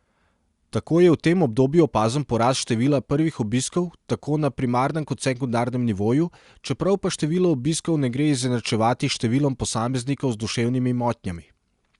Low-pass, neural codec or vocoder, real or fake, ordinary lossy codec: 10.8 kHz; none; real; Opus, 64 kbps